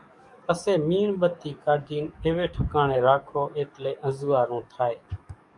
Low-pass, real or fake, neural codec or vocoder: 10.8 kHz; fake; codec, 44.1 kHz, 7.8 kbps, DAC